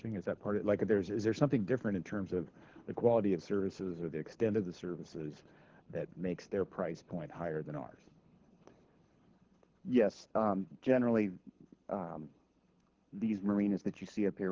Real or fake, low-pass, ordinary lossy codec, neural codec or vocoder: fake; 7.2 kHz; Opus, 16 kbps; codec, 24 kHz, 6 kbps, HILCodec